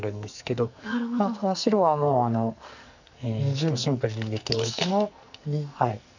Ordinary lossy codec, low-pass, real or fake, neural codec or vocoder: none; 7.2 kHz; fake; codec, 44.1 kHz, 2.6 kbps, SNAC